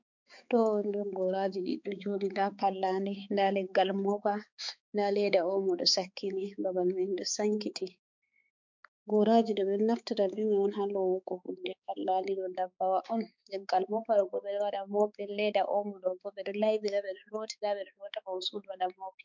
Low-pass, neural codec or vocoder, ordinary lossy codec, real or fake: 7.2 kHz; codec, 16 kHz, 4 kbps, X-Codec, HuBERT features, trained on balanced general audio; MP3, 64 kbps; fake